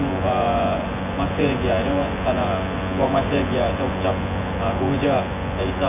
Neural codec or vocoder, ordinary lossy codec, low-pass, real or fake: vocoder, 24 kHz, 100 mel bands, Vocos; none; 3.6 kHz; fake